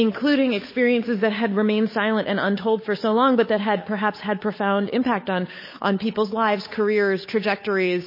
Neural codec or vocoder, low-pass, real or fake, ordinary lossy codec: codec, 16 kHz, 8 kbps, FunCodec, trained on Chinese and English, 25 frames a second; 5.4 kHz; fake; MP3, 24 kbps